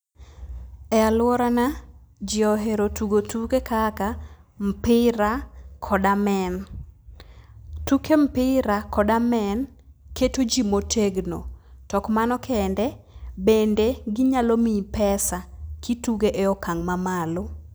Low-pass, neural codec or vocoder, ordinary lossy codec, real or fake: none; none; none; real